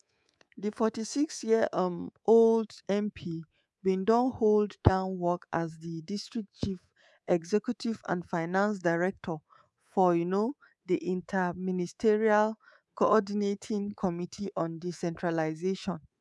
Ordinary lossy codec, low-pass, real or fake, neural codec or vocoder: none; none; fake; codec, 24 kHz, 3.1 kbps, DualCodec